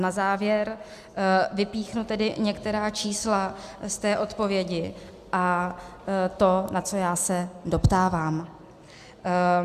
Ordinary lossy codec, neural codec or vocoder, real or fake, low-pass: Opus, 64 kbps; none; real; 14.4 kHz